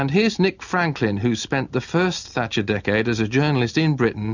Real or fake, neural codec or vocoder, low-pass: real; none; 7.2 kHz